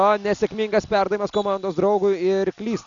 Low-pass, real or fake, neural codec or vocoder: 7.2 kHz; real; none